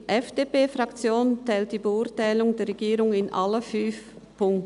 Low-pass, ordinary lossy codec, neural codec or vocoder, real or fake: 10.8 kHz; none; none; real